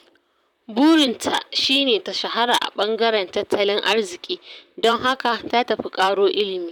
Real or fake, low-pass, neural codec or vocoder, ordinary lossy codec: fake; 19.8 kHz; vocoder, 44.1 kHz, 128 mel bands, Pupu-Vocoder; none